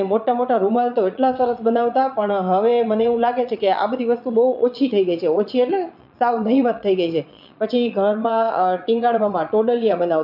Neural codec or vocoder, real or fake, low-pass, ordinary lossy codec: vocoder, 22.05 kHz, 80 mel bands, Vocos; fake; 5.4 kHz; none